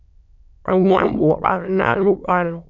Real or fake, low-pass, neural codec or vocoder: fake; 7.2 kHz; autoencoder, 22.05 kHz, a latent of 192 numbers a frame, VITS, trained on many speakers